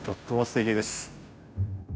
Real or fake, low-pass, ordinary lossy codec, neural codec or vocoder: fake; none; none; codec, 16 kHz, 0.5 kbps, FunCodec, trained on Chinese and English, 25 frames a second